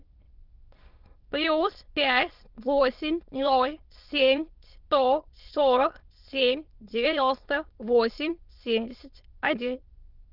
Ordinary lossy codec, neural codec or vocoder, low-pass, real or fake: Opus, 24 kbps; autoencoder, 22.05 kHz, a latent of 192 numbers a frame, VITS, trained on many speakers; 5.4 kHz; fake